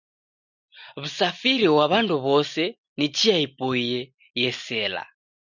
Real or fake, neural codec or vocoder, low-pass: real; none; 7.2 kHz